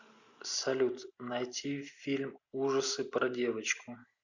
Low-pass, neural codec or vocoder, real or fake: 7.2 kHz; none; real